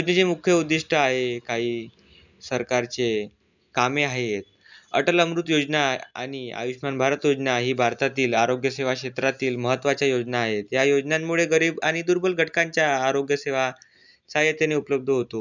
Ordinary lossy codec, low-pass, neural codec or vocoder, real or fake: none; 7.2 kHz; none; real